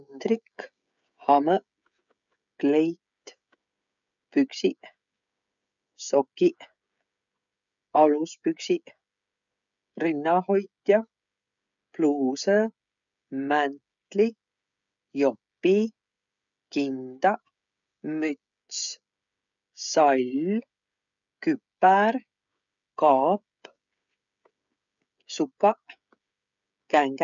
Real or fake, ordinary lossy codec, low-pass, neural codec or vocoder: fake; none; 7.2 kHz; codec, 16 kHz, 16 kbps, FreqCodec, smaller model